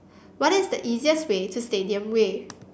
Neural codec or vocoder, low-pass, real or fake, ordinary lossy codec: none; none; real; none